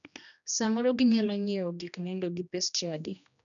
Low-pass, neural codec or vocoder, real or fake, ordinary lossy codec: 7.2 kHz; codec, 16 kHz, 1 kbps, X-Codec, HuBERT features, trained on general audio; fake; none